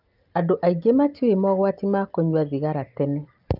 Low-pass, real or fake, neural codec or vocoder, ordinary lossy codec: 5.4 kHz; real; none; Opus, 24 kbps